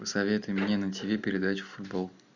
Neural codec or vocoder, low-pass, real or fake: none; 7.2 kHz; real